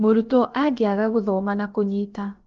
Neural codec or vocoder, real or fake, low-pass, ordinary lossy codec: codec, 16 kHz, about 1 kbps, DyCAST, with the encoder's durations; fake; 7.2 kHz; Opus, 16 kbps